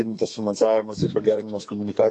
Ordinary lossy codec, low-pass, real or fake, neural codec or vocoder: AAC, 48 kbps; 10.8 kHz; fake; codec, 32 kHz, 1.9 kbps, SNAC